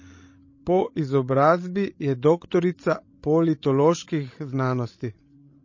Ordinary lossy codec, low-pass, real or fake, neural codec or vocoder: MP3, 32 kbps; 7.2 kHz; fake; codec, 16 kHz, 16 kbps, FreqCodec, larger model